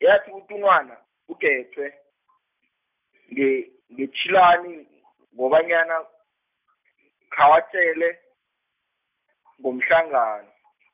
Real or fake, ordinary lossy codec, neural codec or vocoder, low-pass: real; none; none; 3.6 kHz